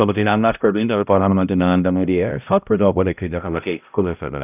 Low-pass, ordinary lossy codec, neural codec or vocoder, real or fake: 3.6 kHz; none; codec, 16 kHz, 0.5 kbps, X-Codec, HuBERT features, trained on balanced general audio; fake